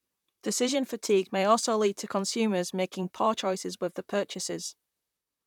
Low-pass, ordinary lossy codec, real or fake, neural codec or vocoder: 19.8 kHz; none; fake; vocoder, 44.1 kHz, 128 mel bands, Pupu-Vocoder